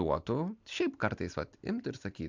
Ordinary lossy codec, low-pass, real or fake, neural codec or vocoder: MP3, 64 kbps; 7.2 kHz; real; none